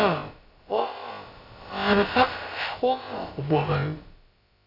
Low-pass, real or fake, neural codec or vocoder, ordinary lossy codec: 5.4 kHz; fake; codec, 16 kHz, about 1 kbps, DyCAST, with the encoder's durations; none